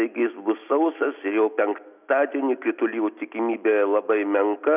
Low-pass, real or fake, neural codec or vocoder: 3.6 kHz; real; none